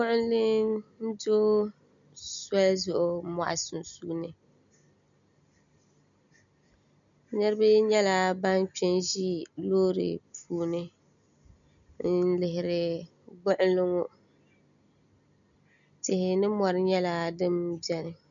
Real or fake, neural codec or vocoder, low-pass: real; none; 7.2 kHz